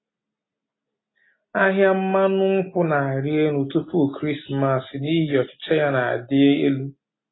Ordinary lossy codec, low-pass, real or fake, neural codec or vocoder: AAC, 16 kbps; 7.2 kHz; real; none